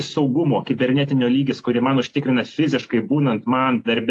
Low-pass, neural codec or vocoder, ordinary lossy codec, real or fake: 10.8 kHz; none; AAC, 48 kbps; real